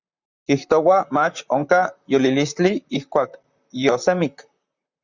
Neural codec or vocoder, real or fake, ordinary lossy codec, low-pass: vocoder, 44.1 kHz, 128 mel bands, Pupu-Vocoder; fake; Opus, 64 kbps; 7.2 kHz